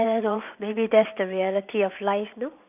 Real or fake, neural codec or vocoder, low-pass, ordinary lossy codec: fake; vocoder, 44.1 kHz, 128 mel bands every 512 samples, BigVGAN v2; 3.6 kHz; none